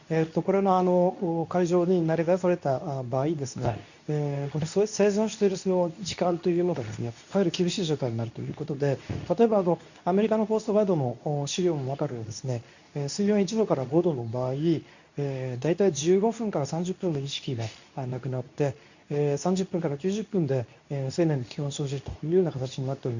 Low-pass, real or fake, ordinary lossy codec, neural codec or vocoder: 7.2 kHz; fake; none; codec, 24 kHz, 0.9 kbps, WavTokenizer, medium speech release version 2